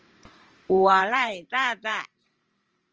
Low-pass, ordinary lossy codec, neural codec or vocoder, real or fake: 7.2 kHz; Opus, 24 kbps; vocoder, 44.1 kHz, 128 mel bands every 512 samples, BigVGAN v2; fake